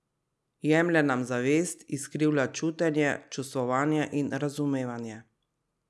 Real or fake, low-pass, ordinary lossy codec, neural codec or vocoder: real; none; none; none